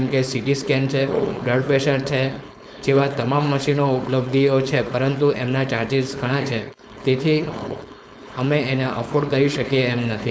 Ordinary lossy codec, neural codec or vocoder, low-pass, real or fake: none; codec, 16 kHz, 4.8 kbps, FACodec; none; fake